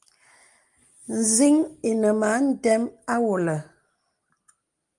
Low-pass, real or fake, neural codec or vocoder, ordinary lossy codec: 10.8 kHz; real; none; Opus, 32 kbps